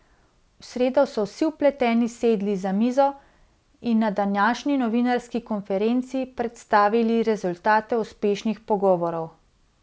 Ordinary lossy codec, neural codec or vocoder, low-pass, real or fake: none; none; none; real